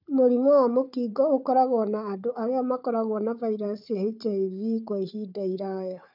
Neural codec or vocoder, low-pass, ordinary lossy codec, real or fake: codec, 16 kHz, 4 kbps, FunCodec, trained on Chinese and English, 50 frames a second; 5.4 kHz; none; fake